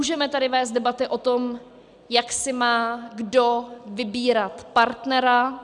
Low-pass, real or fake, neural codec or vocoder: 10.8 kHz; real; none